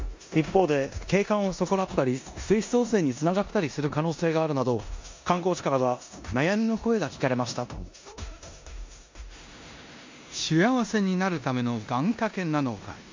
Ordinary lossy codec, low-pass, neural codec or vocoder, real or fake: MP3, 48 kbps; 7.2 kHz; codec, 16 kHz in and 24 kHz out, 0.9 kbps, LongCat-Audio-Codec, four codebook decoder; fake